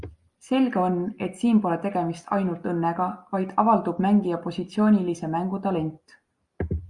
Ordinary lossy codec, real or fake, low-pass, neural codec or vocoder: Opus, 64 kbps; real; 10.8 kHz; none